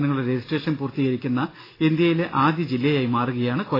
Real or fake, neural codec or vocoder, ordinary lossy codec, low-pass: real; none; AAC, 24 kbps; 5.4 kHz